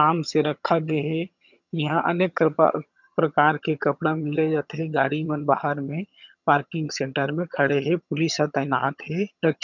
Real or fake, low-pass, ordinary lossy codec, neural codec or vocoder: fake; 7.2 kHz; none; vocoder, 22.05 kHz, 80 mel bands, HiFi-GAN